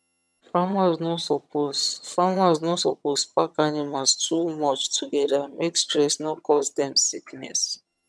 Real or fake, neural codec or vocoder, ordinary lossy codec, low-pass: fake; vocoder, 22.05 kHz, 80 mel bands, HiFi-GAN; none; none